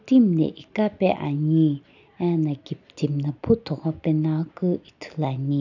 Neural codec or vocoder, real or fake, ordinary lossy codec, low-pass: none; real; none; 7.2 kHz